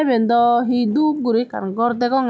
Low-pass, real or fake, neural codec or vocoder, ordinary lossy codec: none; real; none; none